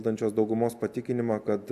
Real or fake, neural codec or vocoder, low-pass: real; none; 14.4 kHz